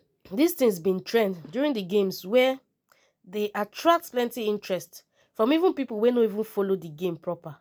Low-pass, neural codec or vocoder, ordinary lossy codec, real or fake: none; none; none; real